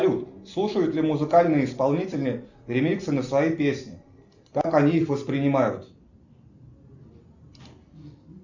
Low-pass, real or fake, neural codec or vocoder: 7.2 kHz; real; none